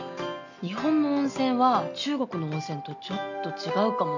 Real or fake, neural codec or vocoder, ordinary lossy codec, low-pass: real; none; none; 7.2 kHz